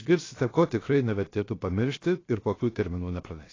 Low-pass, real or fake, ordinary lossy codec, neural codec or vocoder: 7.2 kHz; fake; AAC, 32 kbps; codec, 16 kHz, 0.7 kbps, FocalCodec